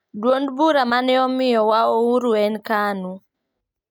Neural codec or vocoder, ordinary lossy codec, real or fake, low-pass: none; none; real; 19.8 kHz